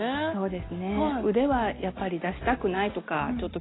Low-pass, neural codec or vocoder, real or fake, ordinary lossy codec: 7.2 kHz; none; real; AAC, 16 kbps